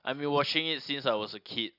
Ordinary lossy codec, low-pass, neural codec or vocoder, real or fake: none; 5.4 kHz; none; real